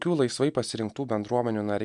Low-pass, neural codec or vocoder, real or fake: 10.8 kHz; none; real